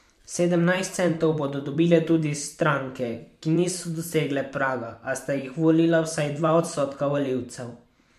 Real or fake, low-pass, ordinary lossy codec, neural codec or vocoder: fake; 14.4 kHz; MP3, 64 kbps; vocoder, 44.1 kHz, 128 mel bands every 512 samples, BigVGAN v2